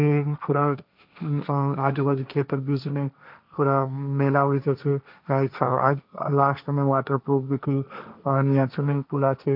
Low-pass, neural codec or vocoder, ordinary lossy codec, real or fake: 5.4 kHz; codec, 16 kHz, 1.1 kbps, Voila-Tokenizer; none; fake